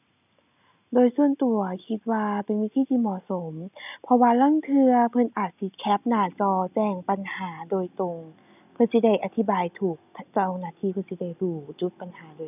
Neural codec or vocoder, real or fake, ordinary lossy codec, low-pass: none; real; none; 3.6 kHz